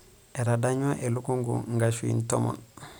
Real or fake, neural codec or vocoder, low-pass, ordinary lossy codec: real; none; none; none